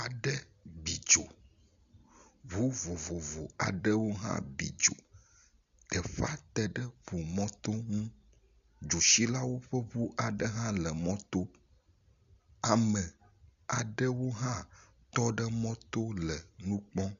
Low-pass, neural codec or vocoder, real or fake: 7.2 kHz; none; real